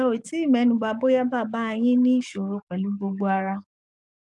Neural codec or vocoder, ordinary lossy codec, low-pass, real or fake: codec, 44.1 kHz, 7.8 kbps, DAC; none; 10.8 kHz; fake